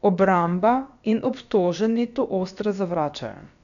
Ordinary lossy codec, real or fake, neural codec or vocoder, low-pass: none; fake; codec, 16 kHz, about 1 kbps, DyCAST, with the encoder's durations; 7.2 kHz